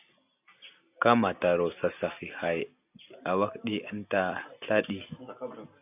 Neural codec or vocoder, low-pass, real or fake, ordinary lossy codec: none; 3.6 kHz; real; AAC, 32 kbps